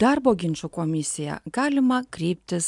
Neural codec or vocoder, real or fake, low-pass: none; real; 10.8 kHz